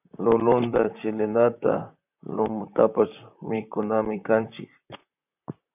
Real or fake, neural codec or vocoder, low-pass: fake; vocoder, 44.1 kHz, 128 mel bands, Pupu-Vocoder; 3.6 kHz